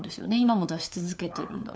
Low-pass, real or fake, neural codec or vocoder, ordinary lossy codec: none; fake; codec, 16 kHz, 4 kbps, FunCodec, trained on LibriTTS, 50 frames a second; none